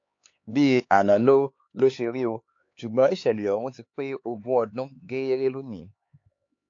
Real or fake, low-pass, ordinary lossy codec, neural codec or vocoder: fake; 7.2 kHz; AAC, 48 kbps; codec, 16 kHz, 4 kbps, X-Codec, HuBERT features, trained on LibriSpeech